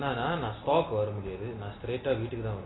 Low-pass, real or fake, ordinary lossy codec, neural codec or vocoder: 7.2 kHz; real; AAC, 16 kbps; none